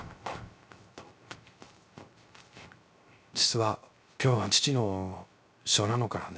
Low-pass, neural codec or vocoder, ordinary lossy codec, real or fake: none; codec, 16 kHz, 0.3 kbps, FocalCodec; none; fake